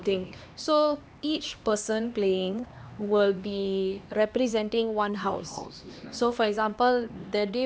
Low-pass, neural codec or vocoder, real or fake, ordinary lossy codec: none; codec, 16 kHz, 2 kbps, X-Codec, HuBERT features, trained on LibriSpeech; fake; none